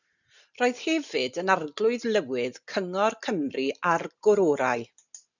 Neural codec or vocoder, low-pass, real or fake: none; 7.2 kHz; real